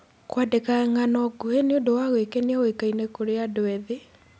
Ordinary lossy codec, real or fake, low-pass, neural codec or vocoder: none; real; none; none